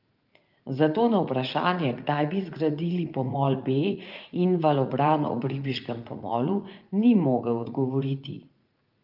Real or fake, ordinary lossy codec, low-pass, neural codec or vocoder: fake; Opus, 32 kbps; 5.4 kHz; vocoder, 44.1 kHz, 80 mel bands, Vocos